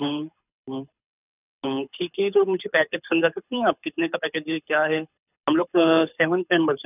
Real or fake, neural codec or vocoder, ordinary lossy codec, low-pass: fake; codec, 24 kHz, 6 kbps, HILCodec; none; 3.6 kHz